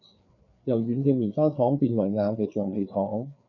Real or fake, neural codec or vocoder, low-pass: fake; codec, 16 kHz, 4 kbps, FreqCodec, larger model; 7.2 kHz